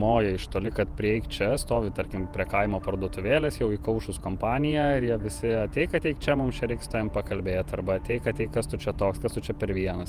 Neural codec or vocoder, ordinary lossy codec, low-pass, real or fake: vocoder, 48 kHz, 128 mel bands, Vocos; Opus, 32 kbps; 14.4 kHz; fake